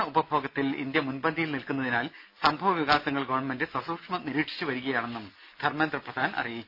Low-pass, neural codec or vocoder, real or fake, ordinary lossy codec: 5.4 kHz; none; real; none